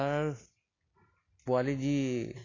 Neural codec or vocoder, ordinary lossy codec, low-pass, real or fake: none; AAC, 32 kbps; 7.2 kHz; real